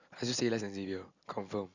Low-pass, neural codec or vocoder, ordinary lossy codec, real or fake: 7.2 kHz; none; none; real